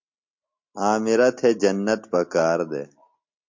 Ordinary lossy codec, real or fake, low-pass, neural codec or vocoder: MP3, 48 kbps; real; 7.2 kHz; none